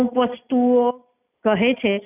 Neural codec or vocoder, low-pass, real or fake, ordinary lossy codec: none; 3.6 kHz; real; none